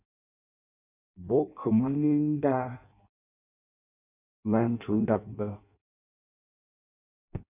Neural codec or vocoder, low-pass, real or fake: codec, 16 kHz in and 24 kHz out, 0.6 kbps, FireRedTTS-2 codec; 3.6 kHz; fake